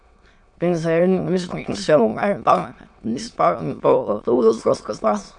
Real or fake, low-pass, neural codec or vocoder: fake; 9.9 kHz; autoencoder, 22.05 kHz, a latent of 192 numbers a frame, VITS, trained on many speakers